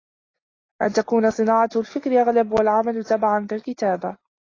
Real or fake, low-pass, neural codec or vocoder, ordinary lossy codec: real; 7.2 kHz; none; AAC, 32 kbps